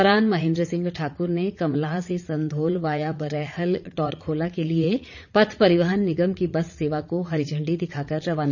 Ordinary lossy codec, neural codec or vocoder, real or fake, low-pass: none; vocoder, 44.1 kHz, 80 mel bands, Vocos; fake; 7.2 kHz